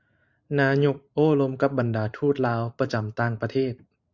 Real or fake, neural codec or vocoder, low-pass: fake; vocoder, 44.1 kHz, 128 mel bands every 512 samples, BigVGAN v2; 7.2 kHz